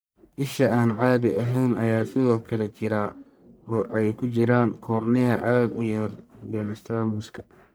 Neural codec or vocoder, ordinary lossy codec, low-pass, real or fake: codec, 44.1 kHz, 1.7 kbps, Pupu-Codec; none; none; fake